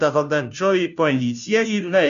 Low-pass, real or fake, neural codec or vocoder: 7.2 kHz; fake; codec, 16 kHz, 0.5 kbps, FunCodec, trained on Chinese and English, 25 frames a second